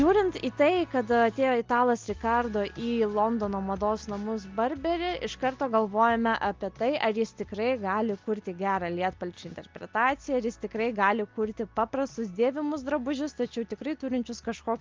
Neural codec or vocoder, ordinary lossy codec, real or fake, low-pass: none; Opus, 32 kbps; real; 7.2 kHz